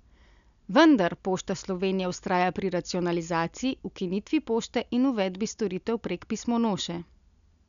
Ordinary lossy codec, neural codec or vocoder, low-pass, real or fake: none; none; 7.2 kHz; real